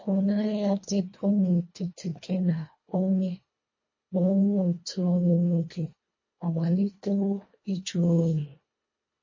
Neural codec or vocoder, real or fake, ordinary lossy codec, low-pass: codec, 24 kHz, 1.5 kbps, HILCodec; fake; MP3, 32 kbps; 7.2 kHz